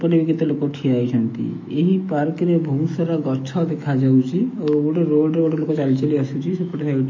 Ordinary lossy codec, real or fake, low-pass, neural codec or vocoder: MP3, 32 kbps; fake; 7.2 kHz; autoencoder, 48 kHz, 128 numbers a frame, DAC-VAE, trained on Japanese speech